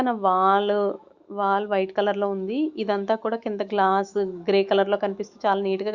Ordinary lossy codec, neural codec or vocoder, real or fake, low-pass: Opus, 64 kbps; autoencoder, 48 kHz, 128 numbers a frame, DAC-VAE, trained on Japanese speech; fake; 7.2 kHz